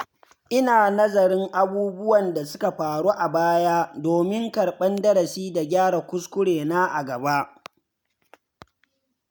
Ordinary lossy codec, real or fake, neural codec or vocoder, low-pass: none; real; none; none